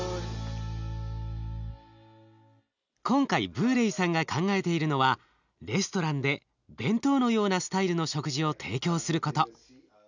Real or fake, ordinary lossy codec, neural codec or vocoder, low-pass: real; none; none; 7.2 kHz